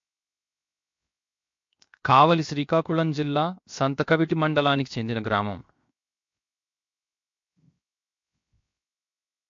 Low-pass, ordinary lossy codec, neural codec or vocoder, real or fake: 7.2 kHz; MP3, 48 kbps; codec, 16 kHz, 0.7 kbps, FocalCodec; fake